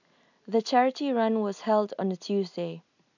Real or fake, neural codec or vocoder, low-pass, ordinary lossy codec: real; none; 7.2 kHz; none